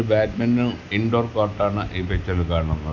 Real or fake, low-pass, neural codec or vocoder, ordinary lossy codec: real; 7.2 kHz; none; none